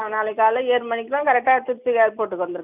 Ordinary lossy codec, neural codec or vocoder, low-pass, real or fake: none; none; 3.6 kHz; real